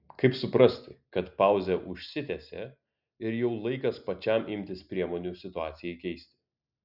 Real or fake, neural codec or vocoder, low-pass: real; none; 5.4 kHz